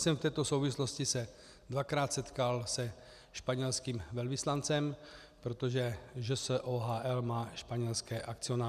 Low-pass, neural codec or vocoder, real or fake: 14.4 kHz; none; real